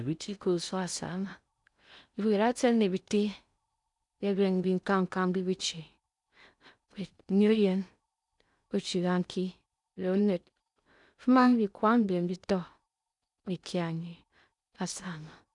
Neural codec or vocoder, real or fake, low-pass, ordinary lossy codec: codec, 16 kHz in and 24 kHz out, 0.6 kbps, FocalCodec, streaming, 4096 codes; fake; 10.8 kHz; none